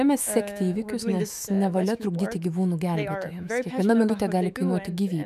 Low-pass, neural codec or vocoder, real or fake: 14.4 kHz; autoencoder, 48 kHz, 128 numbers a frame, DAC-VAE, trained on Japanese speech; fake